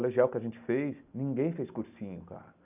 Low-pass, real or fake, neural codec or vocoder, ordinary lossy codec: 3.6 kHz; real; none; none